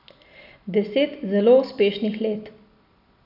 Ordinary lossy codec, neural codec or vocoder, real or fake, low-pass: none; none; real; 5.4 kHz